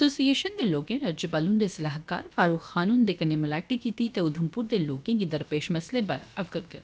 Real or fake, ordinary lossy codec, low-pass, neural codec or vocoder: fake; none; none; codec, 16 kHz, about 1 kbps, DyCAST, with the encoder's durations